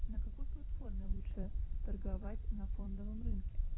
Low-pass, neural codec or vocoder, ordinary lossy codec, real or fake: 7.2 kHz; vocoder, 44.1 kHz, 128 mel bands every 256 samples, BigVGAN v2; AAC, 16 kbps; fake